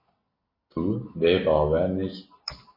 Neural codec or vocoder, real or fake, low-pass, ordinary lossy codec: none; real; 5.4 kHz; MP3, 24 kbps